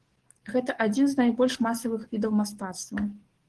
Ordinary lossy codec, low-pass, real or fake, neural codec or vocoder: Opus, 16 kbps; 10.8 kHz; fake; codec, 44.1 kHz, 7.8 kbps, DAC